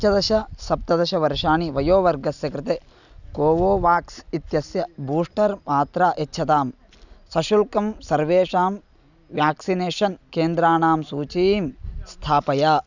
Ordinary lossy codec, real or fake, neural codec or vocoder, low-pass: none; real; none; 7.2 kHz